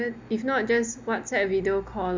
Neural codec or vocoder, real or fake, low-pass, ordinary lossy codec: none; real; 7.2 kHz; MP3, 64 kbps